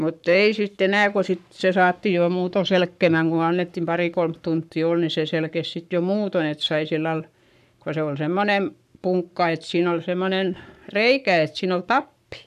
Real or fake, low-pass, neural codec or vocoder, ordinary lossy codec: fake; 14.4 kHz; codec, 44.1 kHz, 7.8 kbps, Pupu-Codec; none